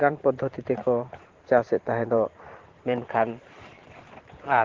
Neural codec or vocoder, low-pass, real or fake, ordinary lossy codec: vocoder, 44.1 kHz, 128 mel bands every 512 samples, BigVGAN v2; 7.2 kHz; fake; Opus, 16 kbps